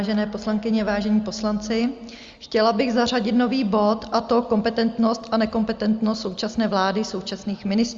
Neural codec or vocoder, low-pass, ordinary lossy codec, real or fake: none; 7.2 kHz; Opus, 32 kbps; real